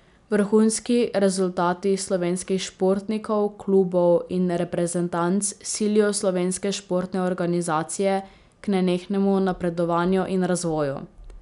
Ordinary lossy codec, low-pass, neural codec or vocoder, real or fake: none; 10.8 kHz; none; real